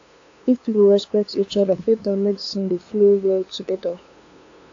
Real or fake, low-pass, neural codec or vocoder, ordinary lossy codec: fake; 7.2 kHz; codec, 16 kHz, 2 kbps, FunCodec, trained on LibriTTS, 25 frames a second; AAC, 48 kbps